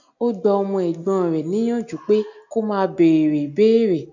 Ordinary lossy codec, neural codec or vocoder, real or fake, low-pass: none; none; real; 7.2 kHz